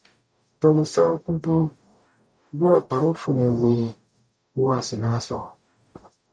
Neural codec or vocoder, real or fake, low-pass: codec, 44.1 kHz, 0.9 kbps, DAC; fake; 9.9 kHz